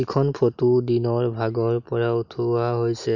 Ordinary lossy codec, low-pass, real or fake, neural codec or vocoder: none; 7.2 kHz; real; none